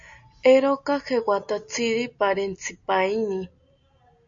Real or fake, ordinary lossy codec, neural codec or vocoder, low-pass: real; AAC, 64 kbps; none; 7.2 kHz